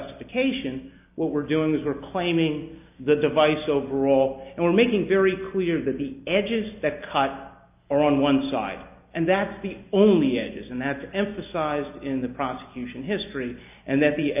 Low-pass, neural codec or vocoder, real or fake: 3.6 kHz; none; real